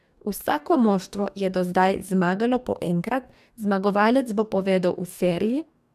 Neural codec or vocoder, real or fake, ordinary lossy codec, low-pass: codec, 44.1 kHz, 2.6 kbps, DAC; fake; none; 14.4 kHz